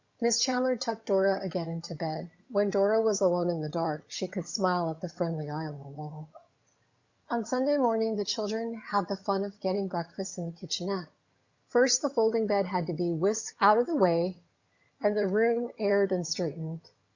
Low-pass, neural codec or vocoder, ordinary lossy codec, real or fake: 7.2 kHz; vocoder, 22.05 kHz, 80 mel bands, HiFi-GAN; Opus, 64 kbps; fake